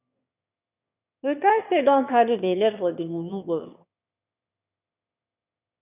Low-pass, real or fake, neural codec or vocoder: 3.6 kHz; fake; autoencoder, 22.05 kHz, a latent of 192 numbers a frame, VITS, trained on one speaker